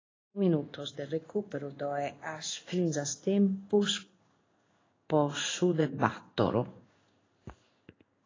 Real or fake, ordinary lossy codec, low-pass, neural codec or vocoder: fake; AAC, 32 kbps; 7.2 kHz; codec, 16 kHz in and 24 kHz out, 1 kbps, XY-Tokenizer